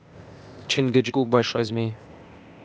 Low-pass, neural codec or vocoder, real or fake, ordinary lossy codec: none; codec, 16 kHz, 0.8 kbps, ZipCodec; fake; none